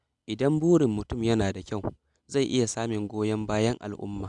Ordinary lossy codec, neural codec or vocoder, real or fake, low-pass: none; none; real; 10.8 kHz